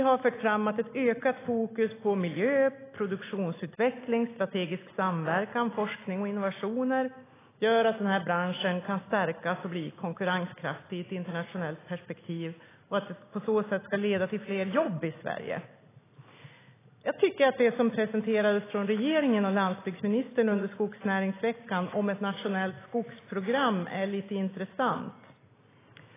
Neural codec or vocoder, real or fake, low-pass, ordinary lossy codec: none; real; 3.6 kHz; AAC, 16 kbps